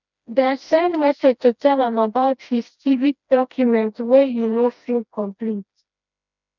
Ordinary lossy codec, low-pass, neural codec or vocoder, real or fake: none; 7.2 kHz; codec, 16 kHz, 1 kbps, FreqCodec, smaller model; fake